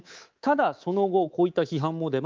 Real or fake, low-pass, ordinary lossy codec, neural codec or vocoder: fake; 7.2 kHz; Opus, 24 kbps; codec, 24 kHz, 3.1 kbps, DualCodec